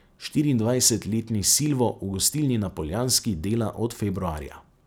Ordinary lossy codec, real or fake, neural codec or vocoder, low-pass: none; real; none; none